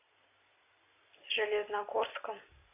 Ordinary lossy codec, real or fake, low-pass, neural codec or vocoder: AAC, 32 kbps; real; 3.6 kHz; none